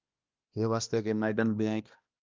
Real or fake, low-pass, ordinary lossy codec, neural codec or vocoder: fake; 7.2 kHz; Opus, 24 kbps; codec, 16 kHz, 1 kbps, X-Codec, HuBERT features, trained on balanced general audio